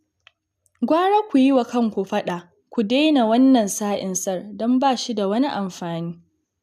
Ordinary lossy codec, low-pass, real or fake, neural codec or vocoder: none; 14.4 kHz; real; none